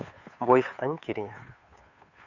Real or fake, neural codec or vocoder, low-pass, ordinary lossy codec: fake; codec, 16 kHz, 2 kbps, FunCodec, trained on Chinese and English, 25 frames a second; 7.2 kHz; none